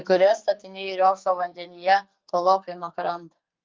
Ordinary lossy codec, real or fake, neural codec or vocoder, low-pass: Opus, 24 kbps; fake; codec, 44.1 kHz, 2.6 kbps, SNAC; 7.2 kHz